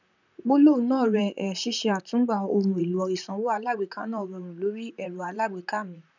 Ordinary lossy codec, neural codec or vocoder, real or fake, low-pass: none; vocoder, 44.1 kHz, 128 mel bands, Pupu-Vocoder; fake; 7.2 kHz